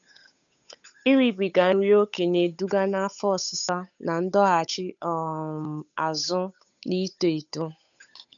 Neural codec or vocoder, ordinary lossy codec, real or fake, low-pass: codec, 16 kHz, 8 kbps, FunCodec, trained on Chinese and English, 25 frames a second; none; fake; 7.2 kHz